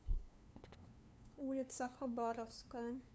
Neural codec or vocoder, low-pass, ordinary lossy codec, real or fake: codec, 16 kHz, 2 kbps, FunCodec, trained on LibriTTS, 25 frames a second; none; none; fake